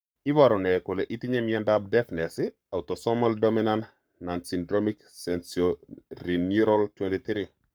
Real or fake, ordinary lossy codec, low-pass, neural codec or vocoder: fake; none; none; codec, 44.1 kHz, 7.8 kbps, Pupu-Codec